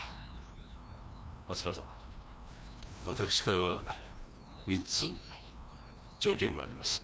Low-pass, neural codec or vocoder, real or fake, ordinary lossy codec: none; codec, 16 kHz, 1 kbps, FreqCodec, larger model; fake; none